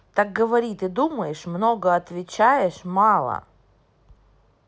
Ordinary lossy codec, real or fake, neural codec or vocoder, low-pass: none; real; none; none